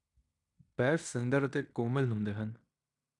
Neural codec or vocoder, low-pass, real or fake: codec, 16 kHz in and 24 kHz out, 0.9 kbps, LongCat-Audio-Codec, fine tuned four codebook decoder; 10.8 kHz; fake